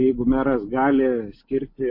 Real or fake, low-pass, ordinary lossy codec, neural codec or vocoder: real; 5.4 kHz; MP3, 32 kbps; none